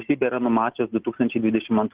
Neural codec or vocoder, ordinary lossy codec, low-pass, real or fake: none; Opus, 16 kbps; 3.6 kHz; real